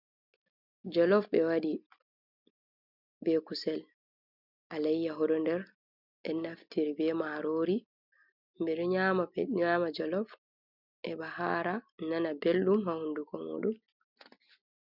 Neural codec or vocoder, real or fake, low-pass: none; real; 5.4 kHz